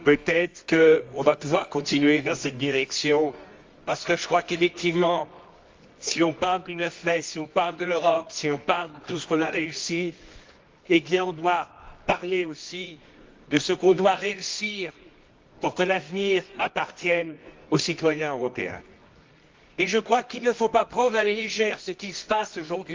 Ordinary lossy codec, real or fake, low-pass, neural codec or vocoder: Opus, 32 kbps; fake; 7.2 kHz; codec, 24 kHz, 0.9 kbps, WavTokenizer, medium music audio release